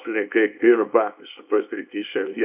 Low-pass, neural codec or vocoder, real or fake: 3.6 kHz; codec, 24 kHz, 0.9 kbps, WavTokenizer, small release; fake